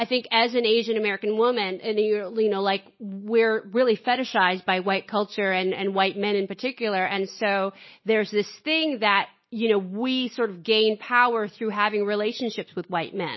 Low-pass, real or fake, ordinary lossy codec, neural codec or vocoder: 7.2 kHz; real; MP3, 24 kbps; none